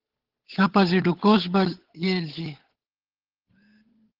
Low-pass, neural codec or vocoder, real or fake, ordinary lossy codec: 5.4 kHz; codec, 16 kHz, 8 kbps, FunCodec, trained on Chinese and English, 25 frames a second; fake; Opus, 16 kbps